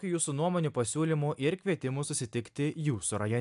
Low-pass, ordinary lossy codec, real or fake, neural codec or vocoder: 10.8 kHz; AAC, 96 kbps; real; none